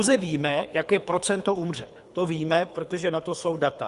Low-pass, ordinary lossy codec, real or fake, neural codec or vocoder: 10.8 kHz; Opus, 64 kbps; fake; codec, 24 kHz, 3 kbps, HILCodec